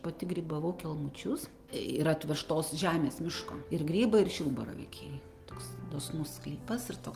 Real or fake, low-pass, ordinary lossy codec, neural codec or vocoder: real; 14.4 kHz; Opus, 24 kbps; none